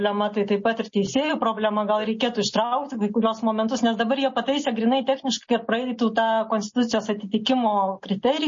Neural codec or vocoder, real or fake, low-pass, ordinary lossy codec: none; real; 7.2 kHz; MP3, 32 kbps